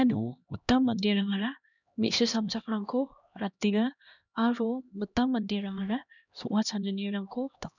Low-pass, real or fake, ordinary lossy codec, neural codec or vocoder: 7.2 kHz; fake; none; codec, 16 kHz, 1 kbps, X-Codec, HuBERT features, trained on LibriSpeech